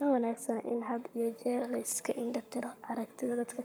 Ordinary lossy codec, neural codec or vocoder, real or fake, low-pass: none; codec, 44.1 kHz, 7.8 kbps, Pupu-Codec; fake; none